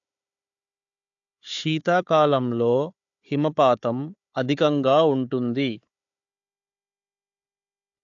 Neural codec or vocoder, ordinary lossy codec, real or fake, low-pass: codec, 16 kHz, 4 kbps, FunCodec, trained on Chinese and English, 50 frames a second; AAC, 64 kbps; fake; 7.2 kHz